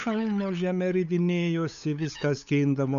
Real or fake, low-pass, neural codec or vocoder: fake; 7.2 kHz; codec, 16 kHz, 8 kbps, FunCodec, trained on LibriTTS, 25 frames a second